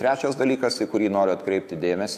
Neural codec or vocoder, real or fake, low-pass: autoencoder, 48 kHz, 128 numbers a frame, DAC-VAE, trained on Japanese speech; fake; 14.4 kHz